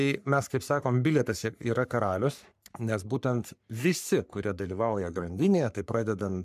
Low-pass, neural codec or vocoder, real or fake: 14.4 kHz; codec, 44.1 kHz, 3.4 kbps, Pupu-Codec; fake